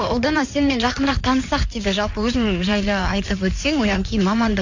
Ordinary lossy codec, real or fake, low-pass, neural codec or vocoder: AAC, 48 kbps; fake; 7.2 kHz; codec, 16 kHz in and 24 kHz out, 2.2 kbps, FireRedTTS-2 codec